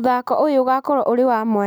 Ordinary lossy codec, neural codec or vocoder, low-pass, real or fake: none; none; none; real